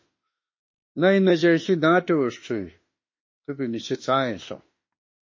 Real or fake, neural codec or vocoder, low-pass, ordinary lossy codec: fake; autoencoder, 48 kHz, 32 numbers a frame, DAC-VAE, trained on Japanese speech; 7.2 kHz; MP3, 32 kbps